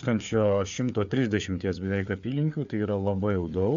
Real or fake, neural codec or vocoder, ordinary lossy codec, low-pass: fake; codec, 16 kHz, 4 kbps, FreqCodec, larger model; MP3, 64 kbps; 7.2 kHz